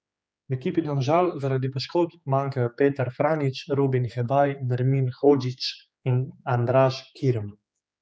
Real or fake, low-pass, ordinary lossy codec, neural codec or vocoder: fake; none; none; codec, 16 kHz, 4 kbps, X-Codec, HuBERT features, trained on general audio